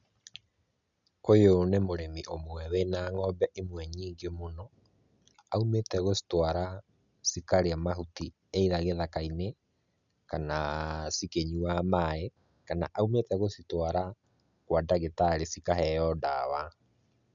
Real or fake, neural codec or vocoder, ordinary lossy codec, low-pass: real; none; none; 7.2 kHz